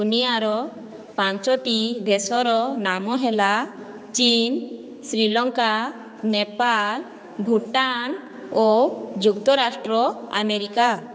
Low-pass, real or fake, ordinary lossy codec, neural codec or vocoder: none; fake; none; codec, 16 kHz, 4 kbps, X-Codec, HuBERT features, trained on general audio